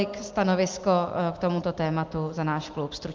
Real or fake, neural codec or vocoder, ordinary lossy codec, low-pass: real; none; Opus, 32 kbps; 7.2 kHz